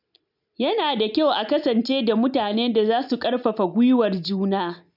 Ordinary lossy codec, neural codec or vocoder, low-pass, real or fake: none; none; 5.4 kHz; real